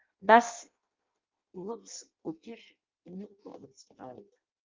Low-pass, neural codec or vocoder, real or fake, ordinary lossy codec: 7.2 kHz; codec, 16 kHz in and 24 kHz out, 0.6 kbps, FireRedTTS-2 codec; fake; Opus, 24 kbps